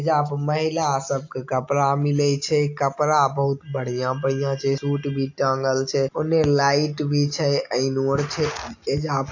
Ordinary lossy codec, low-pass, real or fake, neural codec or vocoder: AAC, 48 kbps; 7.2 kHz; real; none